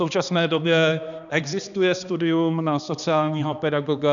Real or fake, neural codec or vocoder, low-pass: fake; codec, 16 kHz, 2 kbps, X-Codec, HuBERT features, trained on balanced general audio; 7.2 kHz